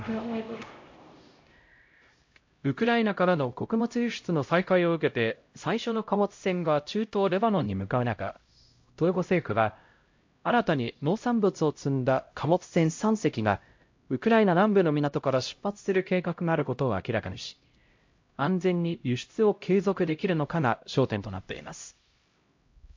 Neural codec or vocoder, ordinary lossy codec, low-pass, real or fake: codec, 16 kHz, 0.5 kbps, X-Codec, HuBERT features, trained on LibriSpeech; MP3, 48 kbps; 7.2 kHz; fake